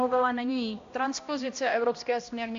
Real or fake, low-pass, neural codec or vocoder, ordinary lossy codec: fake; 7.2 kHz; codec, 16 kHz, 1 kbps, X-Codec, HuBERT features, trained on balanced general audio; AAC, 48 kbps